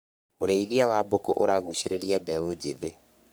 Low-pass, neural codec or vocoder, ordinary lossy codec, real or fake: none; codec, 44.1 kHz, 3.4 kbps, Pupu-Codec; none; fake